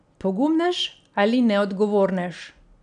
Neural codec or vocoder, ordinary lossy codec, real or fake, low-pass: none; none; real; 9.9 kHz